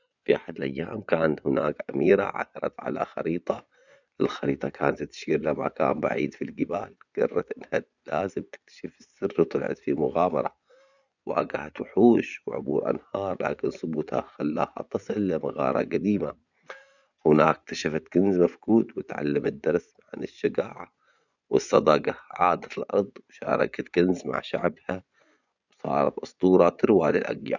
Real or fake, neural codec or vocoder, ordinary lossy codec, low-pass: fake; vocoder, 24 kHz, 100 mel bands, Vocos; none; 7.2 kHz